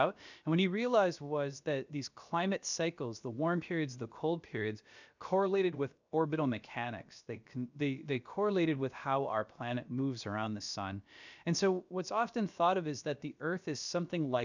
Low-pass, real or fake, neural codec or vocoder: 7.2 kHz; fake; codec, 16 kHz, about 1 kbps, DyCAST, with the encoder's durations